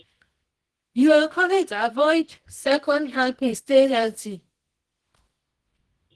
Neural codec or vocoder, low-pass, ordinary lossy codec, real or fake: codec, 24 kHz, 0.9 kbps, WavTokenizer, medium music audio release; 10.8 kHz; Opus, 16 kbps; fake